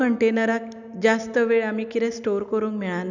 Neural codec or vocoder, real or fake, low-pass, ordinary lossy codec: none; real; 7.2 kHz; none